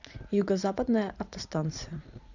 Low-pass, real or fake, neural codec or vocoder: 7.2 kHz; fake; vocoder, 44.1 kHz, 80 mel bands, Vocos